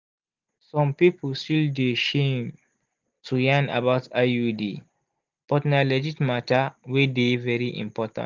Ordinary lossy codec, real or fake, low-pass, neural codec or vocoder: Opus, 32 kbps; real; 7.2 kHz; none